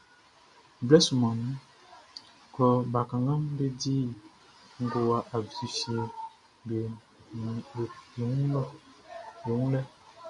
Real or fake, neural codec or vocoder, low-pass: real; none; 10.8 kHz